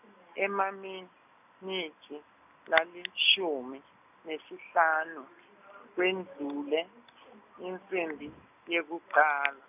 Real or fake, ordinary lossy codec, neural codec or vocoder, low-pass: real; none; none; 3.6 kHz